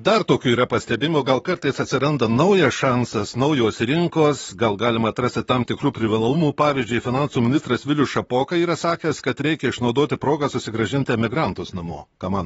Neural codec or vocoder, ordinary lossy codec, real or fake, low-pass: vocoder, 44.1 kHz, 128 mel bands, Pupu-Vocoder; AAC, 24 kbps; fake; 19.8 kHz